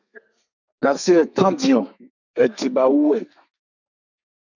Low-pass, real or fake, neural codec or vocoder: 7.2 kHz; fake; codec, 32 kHz, 1.9 kbps, SNAC